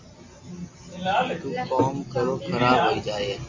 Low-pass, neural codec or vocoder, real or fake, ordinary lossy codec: 7.2 kHz; none; real; MP3, 48 kbps